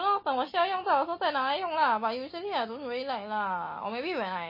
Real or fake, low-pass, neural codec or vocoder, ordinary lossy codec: real; 5.4 kHz; none; MP3, 24 kbps